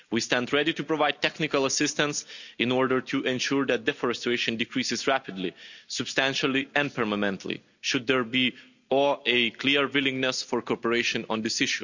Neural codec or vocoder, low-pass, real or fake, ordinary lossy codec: none; 7.2 kHz; real; none